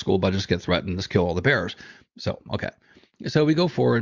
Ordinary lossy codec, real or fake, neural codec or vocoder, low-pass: Opus, 64 kbps; real; none; 7.2 kHz